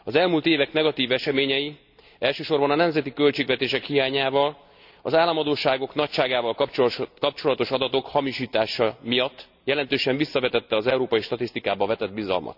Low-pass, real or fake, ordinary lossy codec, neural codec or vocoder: 5.4 kHz; real; none; none